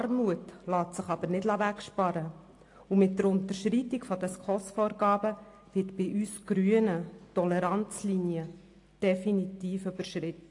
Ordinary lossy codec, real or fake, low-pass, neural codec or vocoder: AAC, 48 kbps; real; 10.8 kHz; none